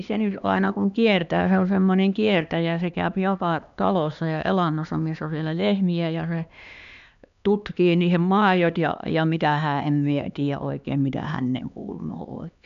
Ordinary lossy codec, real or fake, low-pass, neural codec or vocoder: none; fake; 7.2 kHz; codec, 16 kHz, 2 kbps, X-Codec, HuBERT features, trained on LibriSpeech